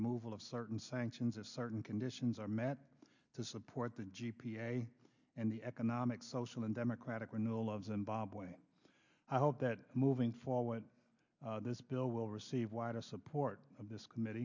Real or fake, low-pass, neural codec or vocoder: real; 7.2 kHz; none